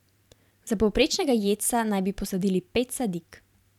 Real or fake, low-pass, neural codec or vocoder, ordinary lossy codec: fake; 19.8 kHz; vocoder, 44.1 kHz, 128 mel bands every 512 samples, BigVGAN v2; none